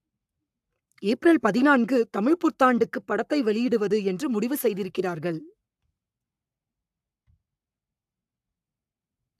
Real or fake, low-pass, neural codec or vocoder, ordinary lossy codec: fake; 14.4 kHz; codec, 44.1 kHz, 7.8 kbps, Pupu-Codec; AAC, 96 kbps